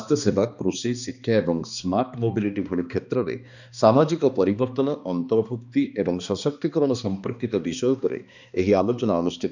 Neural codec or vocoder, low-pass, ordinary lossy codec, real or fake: codec, 16 kHz, 2 kbps, X-Codec, HuBERT features, trained on balanced general audio; 7.2 kHz; none; fake